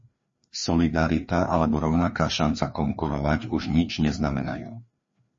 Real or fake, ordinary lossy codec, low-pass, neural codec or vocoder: fake; MP3, 32 kbps; 7.2 kHz; codec, 16 kHz, 2 kbps, FreqCodec, larger model